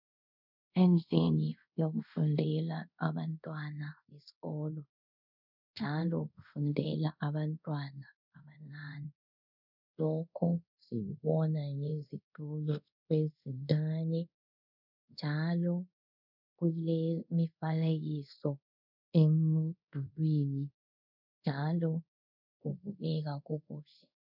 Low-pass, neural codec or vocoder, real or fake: 5.4 kHz; codec, 24 kHz, 0.5 kbps, DualCodec; fake